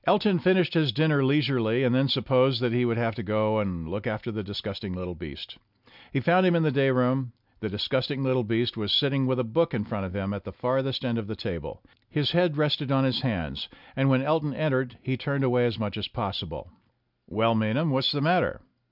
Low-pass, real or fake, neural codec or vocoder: 5.4 kHz; real; none